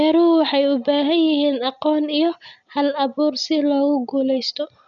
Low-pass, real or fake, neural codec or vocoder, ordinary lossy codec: 7.2 kHz; real; none; none